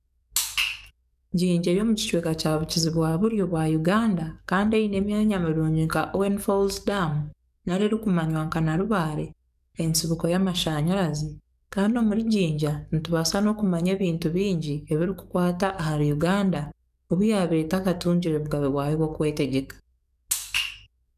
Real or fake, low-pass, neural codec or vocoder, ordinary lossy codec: fake; 14.4 kHz; codec, 44.1 kHz, 7.8 kbps, DAC; none